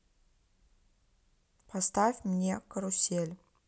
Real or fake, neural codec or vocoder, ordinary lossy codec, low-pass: real; none; none; none